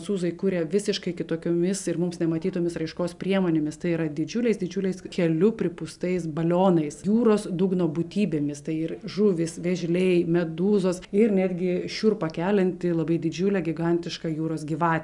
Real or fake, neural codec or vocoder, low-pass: real; none; 10.8 kHz